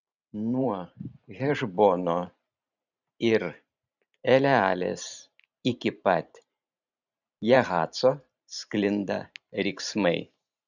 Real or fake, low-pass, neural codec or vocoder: fake; 7.2 kHz; vocoder, 44.1 kHz, 128 mel bands every 512 samples, BigVGAN v2